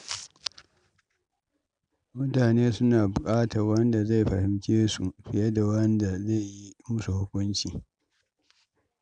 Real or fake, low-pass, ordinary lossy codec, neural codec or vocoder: real; 9.9 kHz; none; none